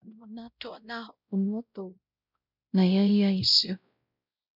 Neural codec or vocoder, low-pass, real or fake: codec, 16 kHz, 0.5 kbps, X-Codec, WavLM features, trained on Multilingual LibriSpeech; 5.4 kHz; fake